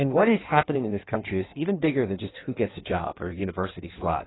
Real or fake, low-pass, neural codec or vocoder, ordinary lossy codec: fake; 7.2 kHz; codec, 16 kHz in and 24 kHz out, 1.1 kbps, FireRedTTS-2 codec; AAC, 16 kbps